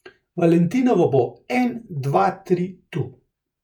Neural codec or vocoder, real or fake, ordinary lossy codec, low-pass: vocoder, 48 kHz, 128 mel bands, Vocos; fake; none; 19.8 kHz